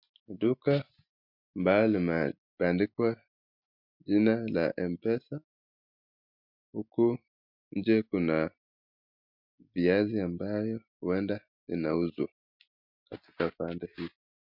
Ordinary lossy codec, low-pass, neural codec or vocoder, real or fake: MP3, 48 kbps; 5.4 kHz; none; real